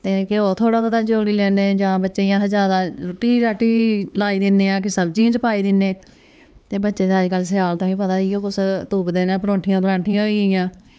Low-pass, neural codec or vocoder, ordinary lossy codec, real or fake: none; codec, 16 kHz, 4 kbps, X-Codec, HuBERT features, trained on LibriSpeech; none; fake